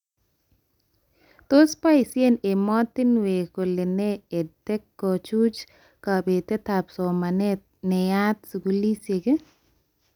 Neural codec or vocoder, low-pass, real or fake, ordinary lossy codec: none; 19.8 kHz; real; none